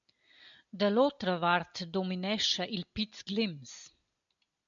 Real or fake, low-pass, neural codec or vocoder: real; 7.2 kHz; none